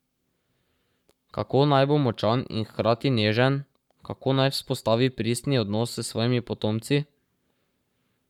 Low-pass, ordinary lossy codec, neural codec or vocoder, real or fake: 19.8 kHz; none; codec, 44.1 kHz, 7.8 kbps, Pupu-Codec; fake